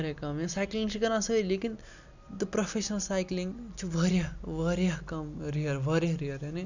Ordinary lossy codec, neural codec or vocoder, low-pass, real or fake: none; none; 7.2 kHz; real